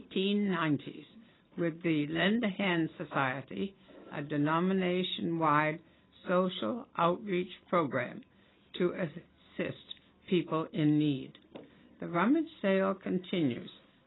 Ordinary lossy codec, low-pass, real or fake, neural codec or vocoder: AAC, 16 kbps; 7.2 kHz; real; none